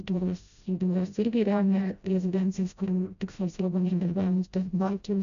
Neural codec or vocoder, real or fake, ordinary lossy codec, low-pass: codec, 16 kHz, 0.5 kbps, FreqCodec, smaller model; fake; MP3, 96 kbps; 7.2 kHz